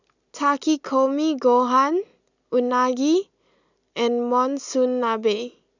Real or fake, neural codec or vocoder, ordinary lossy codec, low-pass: real; none; none; 7.2 kHz